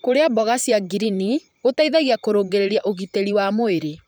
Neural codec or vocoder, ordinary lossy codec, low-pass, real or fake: vocoder, 44.1 kHz, 128 mel bands, Pupu-Vocoder; none; none; fake